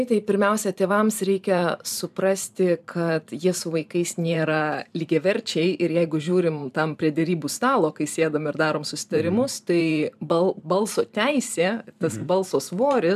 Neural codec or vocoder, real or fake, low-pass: vocoder, 44.1 kHz, 128 mel bands every 512 samples, BigVGAN v2; fake; 14.4 kHz